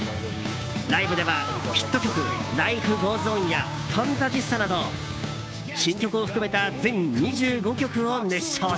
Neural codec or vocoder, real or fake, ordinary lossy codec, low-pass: codec, 16 kHz, 6 kbps, DAC; fake; none; none